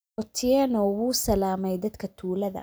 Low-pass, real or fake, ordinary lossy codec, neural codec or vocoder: none; real; none; none